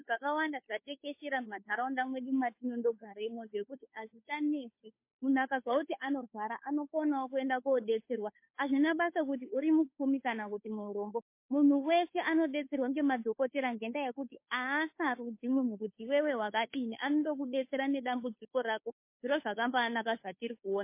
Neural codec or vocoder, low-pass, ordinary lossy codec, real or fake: codec, 16 kHz, 2 kbps, FunCodec, trained on Chinese and English, 25 frames a second; 3.6 kHz; MP3, 24 kbps; fake